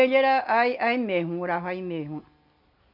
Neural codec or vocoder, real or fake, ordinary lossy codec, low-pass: none; real; none; 5.4 kHz